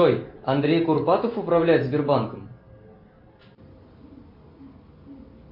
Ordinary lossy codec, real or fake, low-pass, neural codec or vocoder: MP3, 48 kbps; real; 5.4 kHz; none